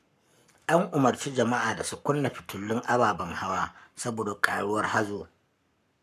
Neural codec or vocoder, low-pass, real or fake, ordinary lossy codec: codec, 44.1 kHz, 7.8 kbps, Pupu-Codec; 14.4 kHz; fake; none